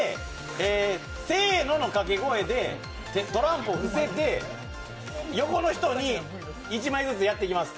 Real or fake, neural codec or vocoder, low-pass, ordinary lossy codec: real; none; none; none